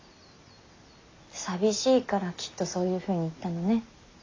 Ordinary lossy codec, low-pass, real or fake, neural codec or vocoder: AAC, 32 kbps; 7.2 kHz; real; none